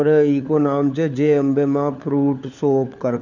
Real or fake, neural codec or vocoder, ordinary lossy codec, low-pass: fake; codec, 16 kHz, 4 kbps, FunCodec, trained on LibriTTS, 50 frames a second; none; 7.2 kHz